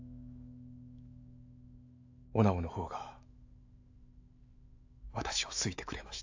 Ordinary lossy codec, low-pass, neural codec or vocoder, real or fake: AAC, 48 kbps; 7.2 kHz; autoencoder, 48 kHz, 128 numbers a frame, DAC-VAE, trained on Japanese speech; fake